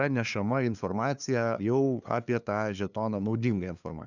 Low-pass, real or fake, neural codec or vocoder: 7.2 kHz; fake; codec, 16 kHz, 2 kbps, FunCodec, trained on LibriTTS, 25 frames a second